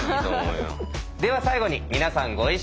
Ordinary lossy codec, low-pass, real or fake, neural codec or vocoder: none; none; real; none